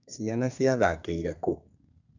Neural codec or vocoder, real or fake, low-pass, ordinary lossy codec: codec, 32 kHz, 1.9 kbps, SNAC; fake; 7.2 kHz; none